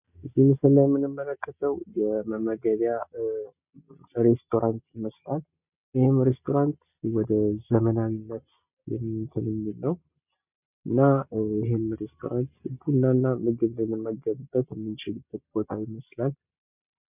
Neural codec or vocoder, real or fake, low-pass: none; real; 3.6 kHz